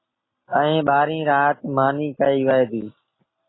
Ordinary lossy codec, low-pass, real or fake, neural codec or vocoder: AAC, 16 kbps; 7.2 kHz; real; none